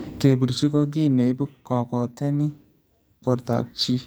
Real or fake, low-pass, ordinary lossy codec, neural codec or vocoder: fake; none; none; codec, 44.1 kHz, 2.6 kbps, SNAC